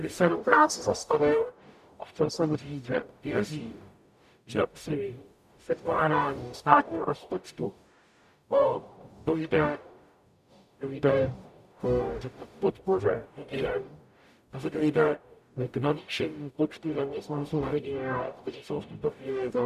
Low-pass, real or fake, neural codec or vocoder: 14.4 kHz; fake; codec, 44.1 kHz, 0.9 kbps, DAC